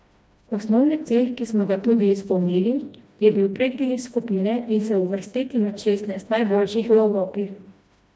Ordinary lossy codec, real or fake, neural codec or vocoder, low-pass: none; fake; codec, 16 kHz, 1 kbps, FreqCodec, smaller model; none